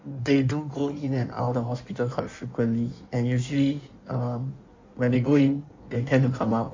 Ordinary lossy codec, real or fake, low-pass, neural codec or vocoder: MP3, 48 kbps; fake; 7.2 kHz; codec, 16 kHz in and 24 kHz out, 1.1 kbps, FireRedTTS-2 codec